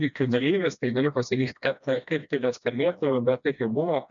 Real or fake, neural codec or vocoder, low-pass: fake; codec, 16 kHz, 1 kbps, FreqCodec, smaller model; 7.2 kHz